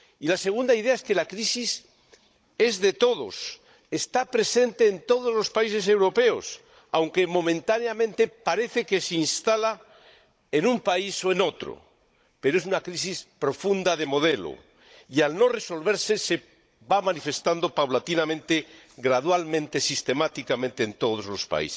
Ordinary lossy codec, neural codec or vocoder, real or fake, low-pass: none; codec, 16 kHz, 16 kbps, FunCodec, trained on Chinese and English, 50 frames a second; fake; none